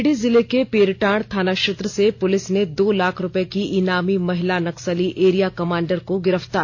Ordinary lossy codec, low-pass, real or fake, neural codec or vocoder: AAC, 48 kbps; 7.2 kHz; real; none